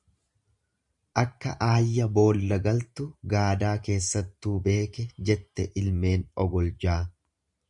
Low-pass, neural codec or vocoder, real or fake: 10.8 kHz; vocoder, 44.1 kHz, 128 mel bands every 512 samples, BigVGAN v2; fake